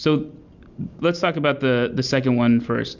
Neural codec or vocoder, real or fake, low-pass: none; real; 7.2 kHz